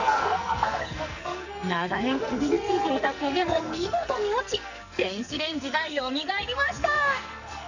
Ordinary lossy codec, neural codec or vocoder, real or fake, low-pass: none; codec, 44.1 kHz, 2.6 kbps, SNAC; fake; 7.2 kHz